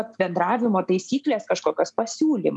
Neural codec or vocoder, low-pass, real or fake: none; 10.8 kHz; real